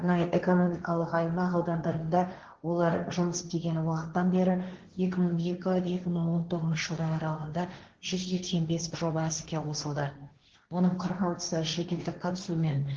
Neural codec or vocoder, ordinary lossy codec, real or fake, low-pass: codec, 16 kHz, 1.1 kbps, Voila-Tokenizer; Opus, 16 kbps; fake; 7.2 kHz